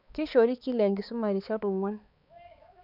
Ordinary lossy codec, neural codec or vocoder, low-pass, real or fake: none; codec, 16 kHz, 4 kbps, X-Codec, HuBERT features, trained on balanced general audio; 5.4 kHz; fake